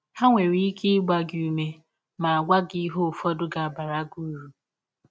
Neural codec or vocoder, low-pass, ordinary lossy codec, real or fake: none; none; none; real